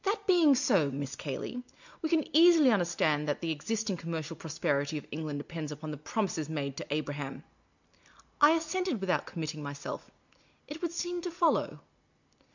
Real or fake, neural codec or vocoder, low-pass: real; none; 7.2 kHz